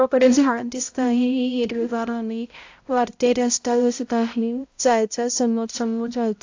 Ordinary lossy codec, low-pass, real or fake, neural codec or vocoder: AAC, 48 kbps; 7.2 kHz; fake; codec, 16 kHz, 0.5 kbps, X-Codec, HuBERT features, trained on balanced general audio